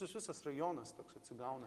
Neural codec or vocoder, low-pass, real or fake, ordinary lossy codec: none; 14.4 kHz; real; Opus, 24 kbps